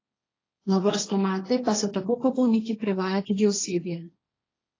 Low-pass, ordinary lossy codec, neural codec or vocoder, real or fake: 7.2 kHz; AAC, 32 kbps; codec, 16 kHz, 1.1 kbps, Voila-Tokenizer; fake